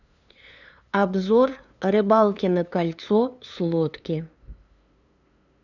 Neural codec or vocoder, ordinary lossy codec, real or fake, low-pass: codec, 16 kHz, 2 kbps, FunCodec, trained on LibriTTS, 25 frames a second; none; fake; 7.2 kHz